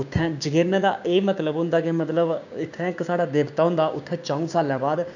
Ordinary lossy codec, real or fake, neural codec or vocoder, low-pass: none; fake; codec, 16 kHz, 6 kbps, DAC; 7.2 kHz